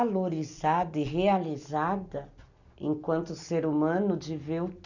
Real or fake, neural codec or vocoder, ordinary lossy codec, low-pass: real; none; none; 7.2 kHz